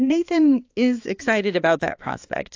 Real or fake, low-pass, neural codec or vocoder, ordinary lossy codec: fake; 7.2 kHz; codec, 16 kHz, 2 kbps, X-Codec, HuBERT features, trained on balanced general audio; AAC, 48 kbps